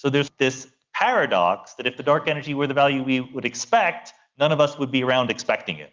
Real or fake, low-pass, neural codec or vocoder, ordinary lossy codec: real; 7.2 kHz; none; Opus, 32 kbps